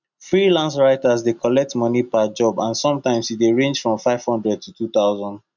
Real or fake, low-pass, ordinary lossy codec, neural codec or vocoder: real; 7.2 kHz; none; none